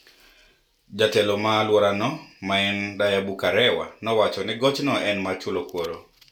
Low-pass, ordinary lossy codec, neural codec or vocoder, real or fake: 19.8 kHz; none; none; real